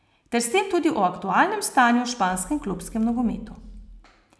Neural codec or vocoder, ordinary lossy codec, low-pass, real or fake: none; none; none; real